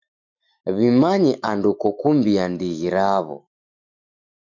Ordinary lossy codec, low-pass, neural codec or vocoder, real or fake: MP3, 64 kbps; 7.2 kHz; autoencoder, 48 kHz, 128 numbers a frame, DAC-VAE, trained on Japanese speech; fake